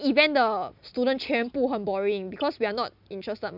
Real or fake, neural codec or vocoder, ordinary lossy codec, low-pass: real; none; none; 5.4 kHz